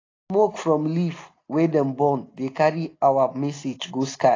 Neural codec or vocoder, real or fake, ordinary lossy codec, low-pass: none; real; AAC, 32 kbps; 7.2 kHz